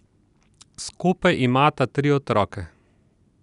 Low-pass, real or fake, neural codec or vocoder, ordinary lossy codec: 10.8 kHz; real; none; none